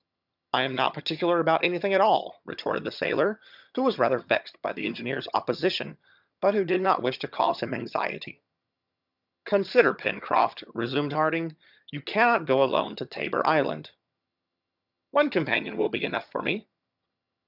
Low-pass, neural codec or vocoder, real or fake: 5.4 kHz; vocoder, 22.05 kHz, 80 mel bands, HiFi-GAN; fake